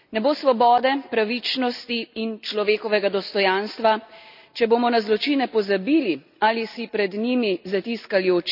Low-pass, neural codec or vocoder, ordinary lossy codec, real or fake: 5.4 kHz; none; none; real